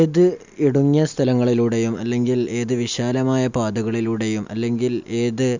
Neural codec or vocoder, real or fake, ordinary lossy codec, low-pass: none; real; Opus, 64 kbps; 7.2 kHz